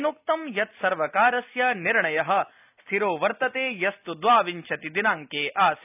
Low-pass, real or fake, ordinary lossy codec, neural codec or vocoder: 3.6 kHz; real; none; none